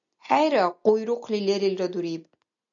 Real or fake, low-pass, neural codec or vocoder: real; 7.2 kHz; none